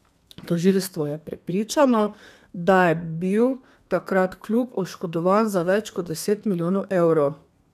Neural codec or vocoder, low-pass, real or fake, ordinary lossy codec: codec, 32 kHz, 1.9 kbps, SNAC; 14.4 kHz; fake; none